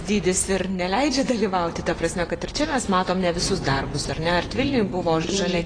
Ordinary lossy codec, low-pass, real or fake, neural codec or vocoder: AAC, 32 kbps; 9.9 kHz; fake; vocoder, 24 kHz, 100 mel bands, Vocos